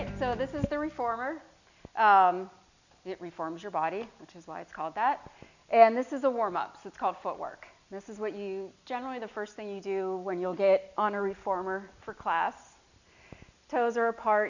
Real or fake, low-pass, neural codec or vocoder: real; 7.2 kHz; none